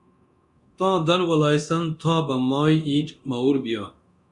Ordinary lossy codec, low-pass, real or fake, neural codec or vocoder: Opus, 64 kbps; 10.8 kHz; fake; codec, 24 kHz, 0.9 kbps, DualCodec